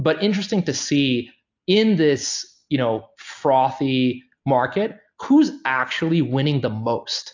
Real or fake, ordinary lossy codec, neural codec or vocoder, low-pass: real; AAC, 48 kbps; none; 7.2 kHz